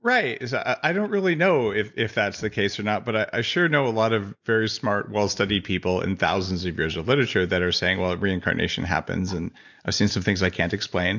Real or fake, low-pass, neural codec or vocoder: real; 7.2 kHz; none